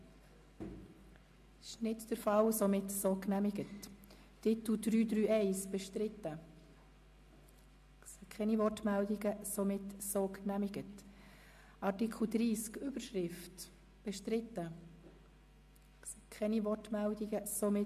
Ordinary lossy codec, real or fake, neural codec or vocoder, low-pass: MP3, 64 kbps; real; none; 14.4 kHz